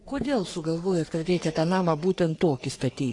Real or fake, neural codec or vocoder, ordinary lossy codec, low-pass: fake; codec, 44.1 kHz, 3.4 kbps, Pupu-Codec; AAC, 48 kbps; 10.8 kHz